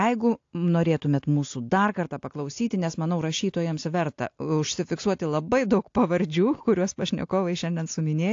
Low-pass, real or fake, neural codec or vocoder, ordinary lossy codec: 7.2 kHz; real; none; AAC, 48 kbps